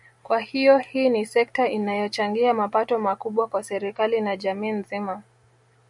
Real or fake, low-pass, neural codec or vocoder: real; 10.8 kHz; none